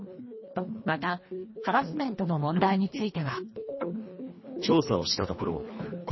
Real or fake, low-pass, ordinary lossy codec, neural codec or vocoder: fake; 7.2 kHz; MP3, 24 kbps; codec, 24 kHz, 1.5 kbps, HILCodec